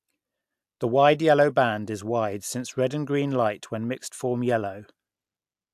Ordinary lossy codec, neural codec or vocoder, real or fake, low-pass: AAC, 96 kbps; none; real; 14.4 kHz